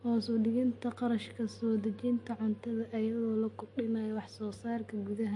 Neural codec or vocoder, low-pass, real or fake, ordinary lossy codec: none; 10.8 kHz; real; MP3, 48 kbps